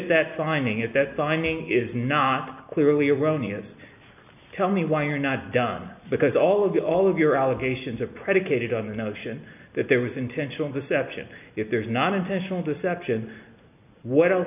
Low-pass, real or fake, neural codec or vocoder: 3.6 kHz; real; none